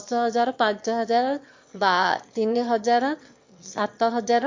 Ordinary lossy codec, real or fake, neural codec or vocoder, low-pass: MP3, 48 kbps; fake; autoencoder, 22.05 kHz, a latent of 192 numbers a frame, VITS, trained on one speaker; 7.2 kHz